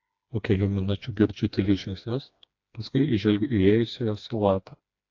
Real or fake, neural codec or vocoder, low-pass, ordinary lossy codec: fake; codec, 16 kHz, 2 kbps, FreqCodec, smaller model; 7.2 kHz; AAC, 48 kbps